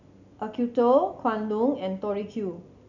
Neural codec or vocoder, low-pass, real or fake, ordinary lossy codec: none; 7.2 kHz; real; none